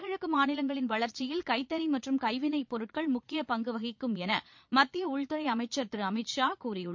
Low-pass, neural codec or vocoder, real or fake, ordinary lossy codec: 7.2 kHz; vocoder, 22.05 kHz, 80 mel bands, Vocos; fake; MP3, 48 kbps